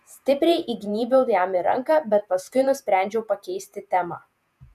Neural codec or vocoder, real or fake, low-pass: vocoder, 48 kHz, 128 mel bands, Vocos; fake; 14.4 kHz